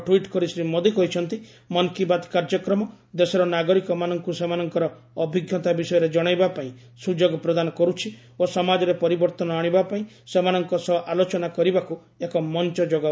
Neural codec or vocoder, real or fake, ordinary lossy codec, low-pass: none; real; none; none